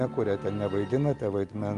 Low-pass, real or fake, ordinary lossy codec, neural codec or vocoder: 10.8 kHz; real; Opus, 32 kbps; none